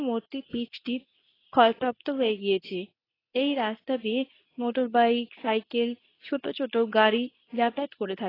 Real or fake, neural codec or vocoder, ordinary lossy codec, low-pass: fake; codec, 24 kHz, 0.9 kbps, WavTokenizer, medium speech release version 2; AAC, 24 kbps; 5.4 kHz